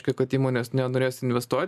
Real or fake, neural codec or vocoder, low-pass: real; none; 14.4 kHz